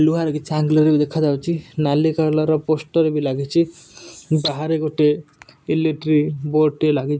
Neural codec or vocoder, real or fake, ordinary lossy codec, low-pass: none; real; none; none